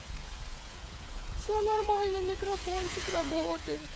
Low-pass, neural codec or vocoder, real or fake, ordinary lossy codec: none; codec, 16 kHz, 8 kbps, FreqCodec, smaller model; fake; none